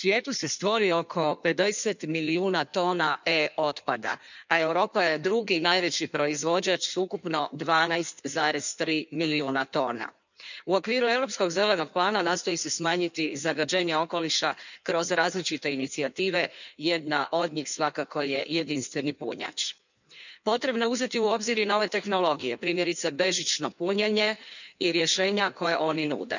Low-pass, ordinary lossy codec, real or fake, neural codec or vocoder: 7.2 kHz; none; fake; codec, 16 kHz in and 24 kHz out, 1.1 kbps, FireRedTTS-2 codec